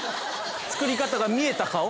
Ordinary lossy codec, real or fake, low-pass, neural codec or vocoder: none; real; none; none